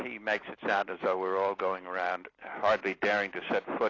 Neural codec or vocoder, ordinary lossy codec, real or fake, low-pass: none; AAC, 32 kbps; real; 7.2 kHz